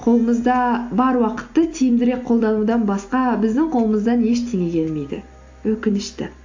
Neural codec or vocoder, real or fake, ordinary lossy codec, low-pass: none; real; AAC, 48 kbps; 7.2 kHz